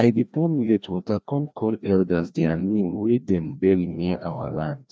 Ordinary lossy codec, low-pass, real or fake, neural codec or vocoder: none; none; fake; codec, 16 kHz, 1 kbps, FreqCodec, larger model